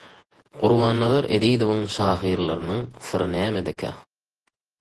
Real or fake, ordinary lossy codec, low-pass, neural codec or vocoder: fake; Opus, 16 kbps; 10.8 kHz; vocoder, 48 kHz, 128 mel bands, Vocos